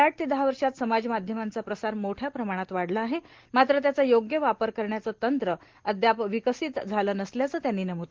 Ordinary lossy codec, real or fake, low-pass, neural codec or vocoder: Opus, 16 kbps; real; 7.2 kHz; none